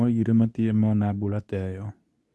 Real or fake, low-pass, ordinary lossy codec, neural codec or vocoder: fake; none; none; codec, 24 kHz, 0.9 kbps, WavTokenizer, medium speech release version 2